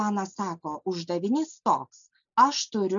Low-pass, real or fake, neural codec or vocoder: 7.2 kHz; real; none